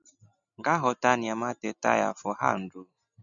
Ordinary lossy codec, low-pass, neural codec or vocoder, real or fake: MP3, 96 kbps; 7.2 kHz; none; real